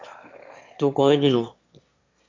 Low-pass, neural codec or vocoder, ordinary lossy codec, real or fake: 7.2 kHz; autoencoder, 22.05 kHz, a latent of 192 numbers a frame, VITS, trained on one speaker; MP3, 48 kbps; fake